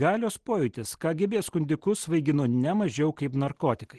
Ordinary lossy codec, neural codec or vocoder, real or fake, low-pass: Opus, 16 kbps; none; real; 10.8 kHz